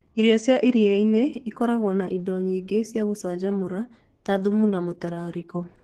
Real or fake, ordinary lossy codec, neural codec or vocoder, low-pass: fake; Opus, 16 kbps; codec, 32 kHz, 1.9 kbps, SNAC; 14.4 kHz